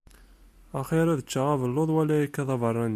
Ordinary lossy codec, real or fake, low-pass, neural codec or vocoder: MP3, 96 kbps; real; 14.4 kHz; none